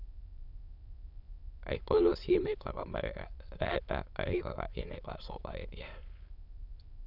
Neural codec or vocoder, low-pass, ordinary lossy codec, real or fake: autoencoder, 22.05 kHz, a latent of 192 numbers a frame, VITS, trained on many speakers; 5.4 kHz; none; fake